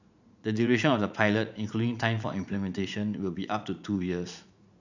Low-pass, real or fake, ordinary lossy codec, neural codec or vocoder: 7.2 kHz; fake; none; vocoder, 44.1 kHz, 80 mel bands, Vocos